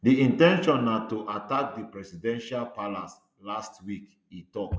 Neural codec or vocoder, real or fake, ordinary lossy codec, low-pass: none; real; none; none